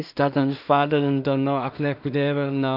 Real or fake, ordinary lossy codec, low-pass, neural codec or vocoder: fake; none; 5.4 kHz; codec, 16 kHz in and 24 kHz out, 0.4 kbps, LongCat-Audio-Codec, two codebook decoder